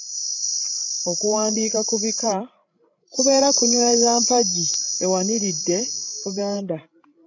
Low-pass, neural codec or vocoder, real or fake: 7.2 kHz; codec, 16 kHz, 16 kbps, FreqCodec, larger model; fake